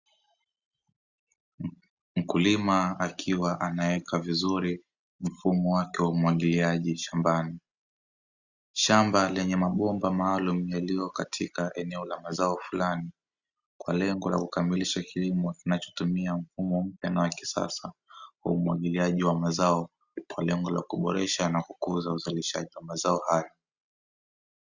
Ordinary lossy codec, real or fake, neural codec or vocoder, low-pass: Opus, 64 kbps; real; none; 7.2 kHz